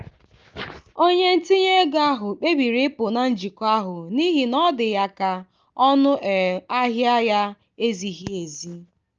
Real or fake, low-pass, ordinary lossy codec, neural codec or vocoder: real; 7.2 kHz; Opus, 24 kbps; none